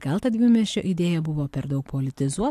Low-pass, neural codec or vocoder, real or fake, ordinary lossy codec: 14.4 kHz; none; real; AAC, 64 kbps